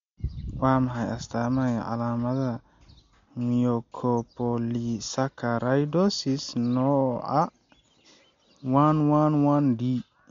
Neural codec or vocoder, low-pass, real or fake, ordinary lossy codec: none; 7.2 kHz; real; MP3, 48 kbps